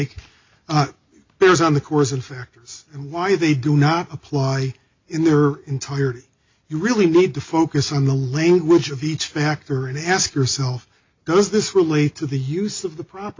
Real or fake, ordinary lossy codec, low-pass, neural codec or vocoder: real; MP3, 64 kbps; 7.2 kHz; none